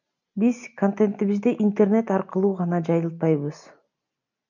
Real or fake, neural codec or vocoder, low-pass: real; none; 7.2 kHz